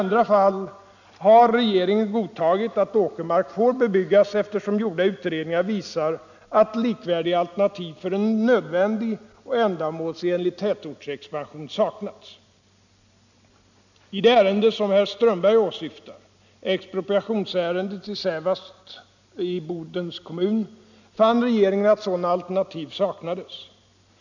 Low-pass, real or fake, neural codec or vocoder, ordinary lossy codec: 7.2 kHz; real; none; none